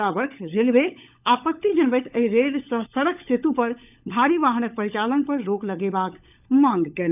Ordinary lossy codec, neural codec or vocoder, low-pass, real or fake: none; codec, 16 kHz, 16 kbps, FunCodec, trained on LibriTTS, 50 frames a second; 3.6 kHz; fake